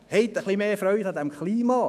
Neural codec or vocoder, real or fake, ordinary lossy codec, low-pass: autoencoder, 48 kHz, 128 numbers a frame, DAC-VAE, trained on Japanese speech; fake; none; 14.4 kHz